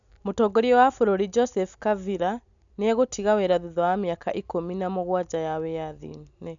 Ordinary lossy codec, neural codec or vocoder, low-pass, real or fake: none; none; 7.2 kHz; real